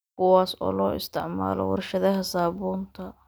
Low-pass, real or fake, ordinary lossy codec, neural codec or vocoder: none; real; none; none